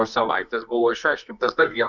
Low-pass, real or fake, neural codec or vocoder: 7.2 kHz; fake; codec, 24 kHz, 0.9 kbps, WavTokenizer, medium music audio release